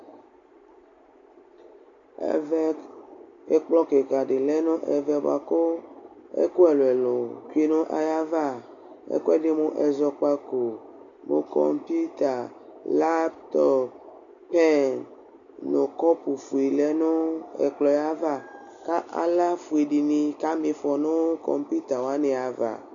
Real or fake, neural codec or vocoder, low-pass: real; none; 7.2 kHz